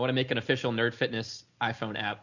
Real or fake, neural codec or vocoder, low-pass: real; none; 7.2 kHz